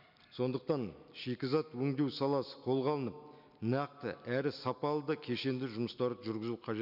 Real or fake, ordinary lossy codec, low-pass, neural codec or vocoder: real; none; 5.4 kHz; none